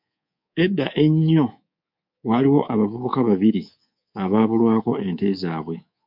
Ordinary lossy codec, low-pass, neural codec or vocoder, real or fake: MP3, 48 kbps; 5.4 kHz; codec, 24 kHz, 3.1 kbps, DualCodec; fake